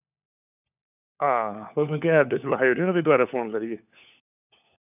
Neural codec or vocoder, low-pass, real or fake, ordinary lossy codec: codec, 16 kHz, 4 kbps, FunCodec, trained on LibriTTS, 50 frames a second; 3.6 kHz; fake; none